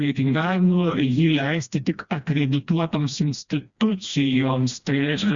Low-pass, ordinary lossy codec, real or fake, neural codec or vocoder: 7.2 kHz; Opus, 64 kbps; fake; codec, 16 kHz, 1 kbps, FreqCodec, smaller model